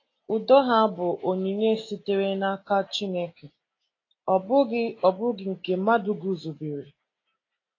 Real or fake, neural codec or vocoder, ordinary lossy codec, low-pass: real; none; AAC, 32 kbps; 7.2 kHz